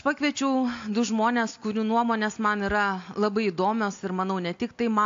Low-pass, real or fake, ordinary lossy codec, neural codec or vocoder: 7.2 kHz; real; AAC, 48 kbps; none